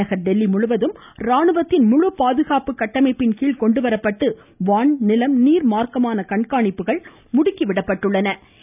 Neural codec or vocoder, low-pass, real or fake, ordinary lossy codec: none; 3.6 kHz; real; none